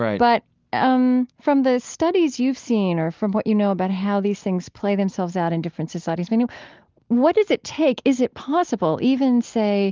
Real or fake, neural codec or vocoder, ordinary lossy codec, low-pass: real; none; Opus, 32 kbps; 7.2 kHz